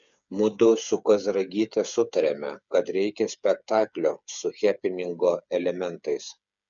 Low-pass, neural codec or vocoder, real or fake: 7.2 kHz; codec, 16 kHz, 8 kbps, FreqCodec, smaller model; fake